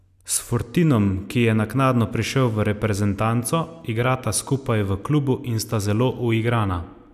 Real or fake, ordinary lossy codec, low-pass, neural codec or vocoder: real; none; 14.4 kHz; none